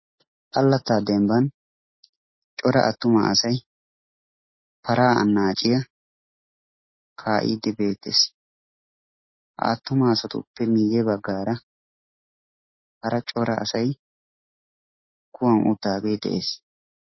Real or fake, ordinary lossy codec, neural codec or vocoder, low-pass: real; MP3, 24 kbps; none; 7.2 kHz